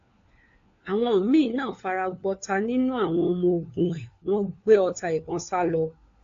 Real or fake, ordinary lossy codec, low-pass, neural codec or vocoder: fake; AAC, 48 kbps; 7.2 kHz; codec, 16 kHz, 4 kbps, FunCodec, trained on LibriTTS, 50 frames a second